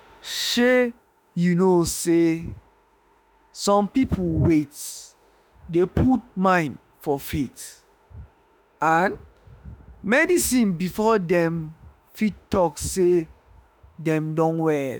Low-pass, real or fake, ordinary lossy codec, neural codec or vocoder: none; fake; none; autoencoder, 48 kHz, 32 numbers a frame, DAC-VAE, trained on Japanese speech